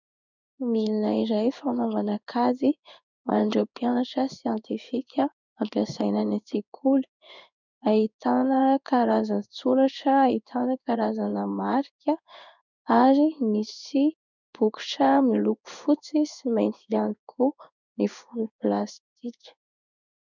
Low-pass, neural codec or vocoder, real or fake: 7.2 kHz; codec, 16 kHz in and 24 kHz out, 1 kbps, XY-Tokenizer; fake